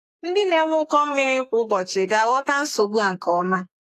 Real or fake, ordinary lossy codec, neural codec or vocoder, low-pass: fake; AAC, 64 kbps; codec, 32 kHz, 1.9 kbps, SNAC; 14.4 kHz